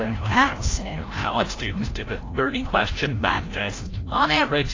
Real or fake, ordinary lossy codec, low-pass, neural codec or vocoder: fake; AAC, 32 kbps; 7.2 kHz; codec, 16 kHz, 0.5 kbps, FreqCodec, larger model